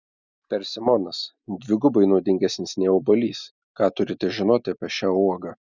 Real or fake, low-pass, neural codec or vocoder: real; 7.2 kHz; none